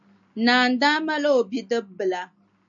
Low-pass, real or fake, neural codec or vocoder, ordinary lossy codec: 7.2 kHz; real; none; AAC, 64 kbps